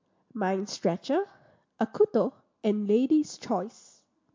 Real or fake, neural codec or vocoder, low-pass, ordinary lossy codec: real; none; 7.2 kHz; MP3, 48 kbps